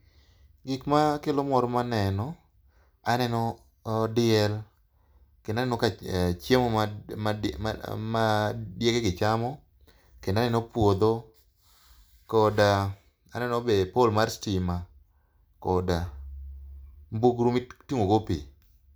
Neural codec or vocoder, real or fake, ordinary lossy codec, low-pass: none; real; none; none